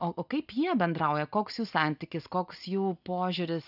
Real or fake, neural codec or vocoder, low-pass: real; none; 5.4 kHz